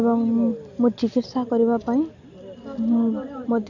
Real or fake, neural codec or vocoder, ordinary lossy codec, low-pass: real; none; none; 7.2 kHz